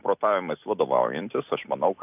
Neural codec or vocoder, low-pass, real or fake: none; 3.6 kHz; real